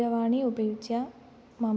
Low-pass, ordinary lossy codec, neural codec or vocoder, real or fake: none; none; none; real